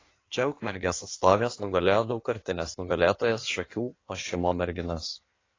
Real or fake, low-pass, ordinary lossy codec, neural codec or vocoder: fake; 7.2 kHz; AAC, 32 kbps; codec, 16 kHz in and 24 kHz out, 1.1 kbps, FireRedTTS-2 codec